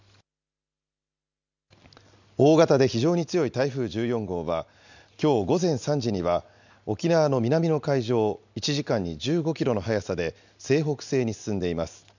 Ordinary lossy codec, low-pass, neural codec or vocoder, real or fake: none; 7.2 kHz; none; real